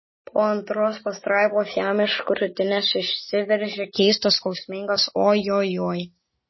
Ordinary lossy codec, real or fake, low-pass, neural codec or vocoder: MP3, 24 kbps; real; 7.2 kHz; none